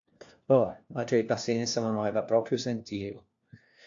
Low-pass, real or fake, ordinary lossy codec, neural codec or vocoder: 7.2 kHz; fake; AAC, 48 kbps; codec, 16 kHz, 1 kbps, FunCodec, trained on LibriTTS, 50 frames a second